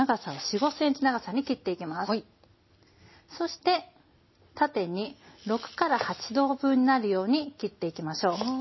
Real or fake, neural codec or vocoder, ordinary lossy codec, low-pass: real; none; MP3, 24 kbps; 7.2 kHz